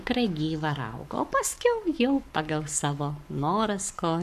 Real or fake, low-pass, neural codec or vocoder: fake; 14.4 kHz; codec, 44.1 kHz, 7.8 kbps, Pupu-Codec